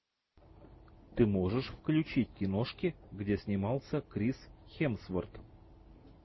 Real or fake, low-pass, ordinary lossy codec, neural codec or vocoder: real; 7.2 kHz; MP3, 24 kbps; none